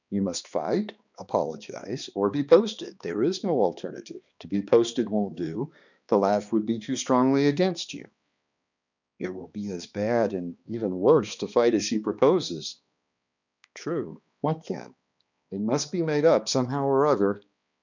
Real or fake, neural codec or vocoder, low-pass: fake; codec, 16 kHz, 2 kbps, X-Codec, HuBERT features, trained on balanced general audio; 7.2 kHz